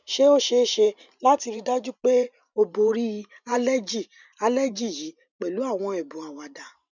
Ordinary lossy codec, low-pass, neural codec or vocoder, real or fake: none; 7.2 kHz; vocoder, 44.1 kHz, 128 mel bands every 512 samples, BigVGAN v2; fake